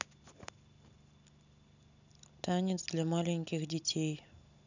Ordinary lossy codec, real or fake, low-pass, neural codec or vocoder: none; real; 7.2 kHz; none